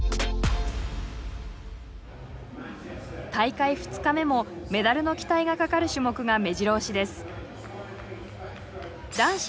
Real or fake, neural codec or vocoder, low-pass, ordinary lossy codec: real; none; none; none